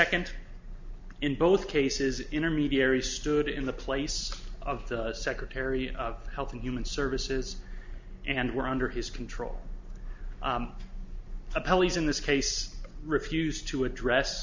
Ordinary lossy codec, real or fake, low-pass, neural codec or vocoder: MP3, 64 kbps; real; 7.2 kHz; none